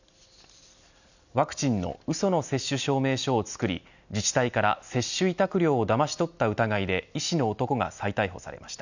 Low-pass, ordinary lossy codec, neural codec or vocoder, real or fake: 7.2 kHz; none; none; real